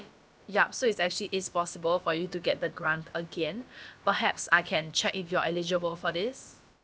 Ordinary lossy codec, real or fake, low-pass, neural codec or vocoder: none; fake; none; codec, 16 kHz, about 1 kbps, DyCAST, with the encoder's durations